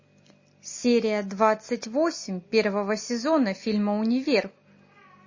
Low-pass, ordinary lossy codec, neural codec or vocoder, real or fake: 7.2 kHz; MP3, 32 kbps; none; real